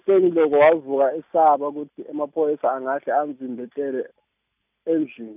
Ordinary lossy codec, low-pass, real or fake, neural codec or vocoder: none; 3.6 kHz; real; none